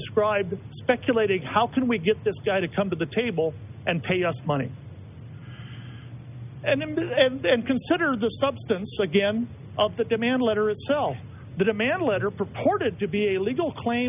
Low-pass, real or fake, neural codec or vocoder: 3.6 kHz; real; none